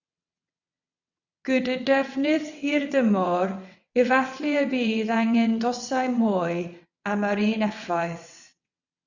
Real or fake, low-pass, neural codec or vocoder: fake; 7.2 kHz; vocoder, 22.05 kHz, 80 mel bands, WaveNeXt